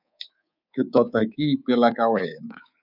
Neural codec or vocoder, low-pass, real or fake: codec, 24 kHz, 3.1 kbps, DualCodec; 5.4 kHz; fake